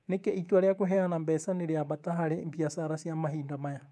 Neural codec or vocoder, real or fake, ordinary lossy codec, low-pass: codec, 24 kHz, 3.1 kbps, DualCodec; fake; none; none